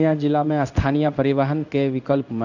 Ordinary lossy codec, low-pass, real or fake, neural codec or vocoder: none; 7.2 kHz; fake; codec, 16 kHz in and 24 kHz out, 1 kbps, XY-Tokenizer